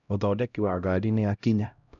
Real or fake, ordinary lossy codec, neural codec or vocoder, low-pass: fake; none; codec, 16 kHz, 0.5 kbps, X-Codec, HuBERT features, trained on LibriSpeech; 7.2 kHz